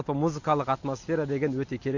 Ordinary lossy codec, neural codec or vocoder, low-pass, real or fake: none; none; 7.2 kHz; real